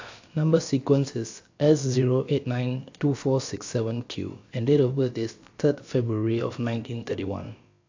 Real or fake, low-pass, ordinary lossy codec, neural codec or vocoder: fake; 7.2 kHz; AAC, 48 kbps; codec, 16 kHz, about 1 kbps, DyCAST, with the encoder's durations